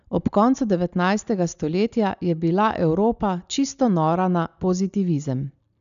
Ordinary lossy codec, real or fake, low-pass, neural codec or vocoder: none; real; 7.2 kHz; none